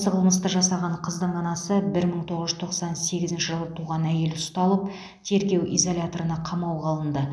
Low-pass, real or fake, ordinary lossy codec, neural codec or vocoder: none; real; none; none